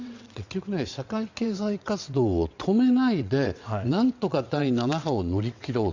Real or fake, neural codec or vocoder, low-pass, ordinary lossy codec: fake; vocoder, 22.05 kHz, 80 mel bands, WaveNeXt; 7.2 kHz; Opus, 64 kbps